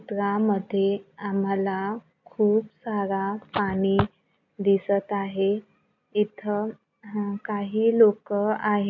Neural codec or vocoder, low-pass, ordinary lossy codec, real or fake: none; 7.2 kHz; none; real